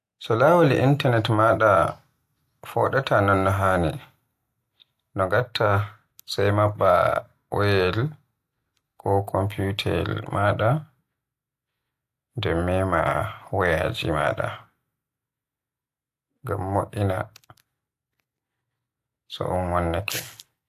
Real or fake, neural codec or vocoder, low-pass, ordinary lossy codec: real; none; 14.4 kHz; AAC, 64 kbps